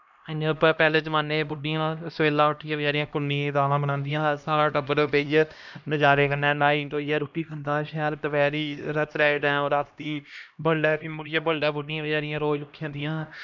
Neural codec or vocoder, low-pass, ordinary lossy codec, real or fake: codec, 16 kHz, 1 kbps, X-Codec, HuBERT features, trained on LibriSpeech; 7.2 kHz; none; fake